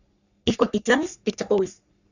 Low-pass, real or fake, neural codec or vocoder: 7.2 kHz; fake; codec, 44.1 kHz, 3.4 kbps, Pupu-Codec